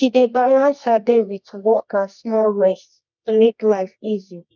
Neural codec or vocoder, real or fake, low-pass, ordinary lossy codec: codec, 24 kHz, 0.9 kbps, WavTokenizer, medium music audio release; fake; 7.2 kHz; none